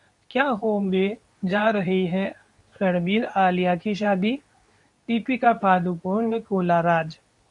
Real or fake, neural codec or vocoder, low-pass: fake; codec, 24 kHz, 0.9 kbps, WavTokenizer, medium speech release version 2; 10.8 kHz